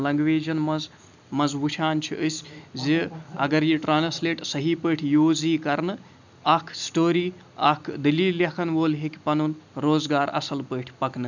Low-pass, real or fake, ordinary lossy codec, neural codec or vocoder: 7.2 kHz; real; none; none